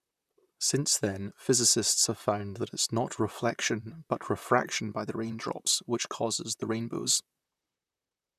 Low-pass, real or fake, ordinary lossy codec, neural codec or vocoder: 14.4 kHz; fake; none; vocoder, 44.1 kHz, 128 mel bands, Pupu-Vocoder